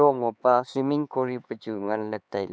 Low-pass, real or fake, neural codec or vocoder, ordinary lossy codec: none; fake; codec, 16 kHz, 4 kbps, X-Codec, HuBERT features, trained on LibriSpeech; none